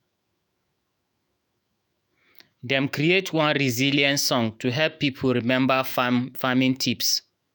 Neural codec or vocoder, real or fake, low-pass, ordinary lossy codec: autoencoder, 48 kHz, 128 numbers a frame, DAC-VAE, trained on Japanese speech; fake; none; none